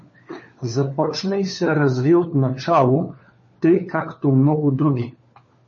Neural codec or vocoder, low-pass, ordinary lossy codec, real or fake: codec, 16 kHz, 4 kbps, FunCodec, trained on LibriTTS, 50 frames a second; 7.2 kHz; MP3, 32 kbps; fake